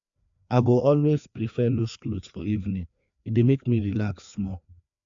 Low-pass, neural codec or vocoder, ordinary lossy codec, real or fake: 7.2 kHz; codec, 16 kHz, 2 kbps, FreqCodec, larger model; MP3, 64 kbps; fake